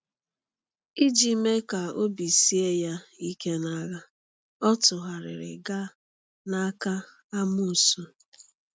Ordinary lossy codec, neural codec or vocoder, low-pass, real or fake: none; none; none; real